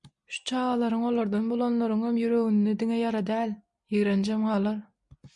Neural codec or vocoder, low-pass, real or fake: none; 10.8 kHz; real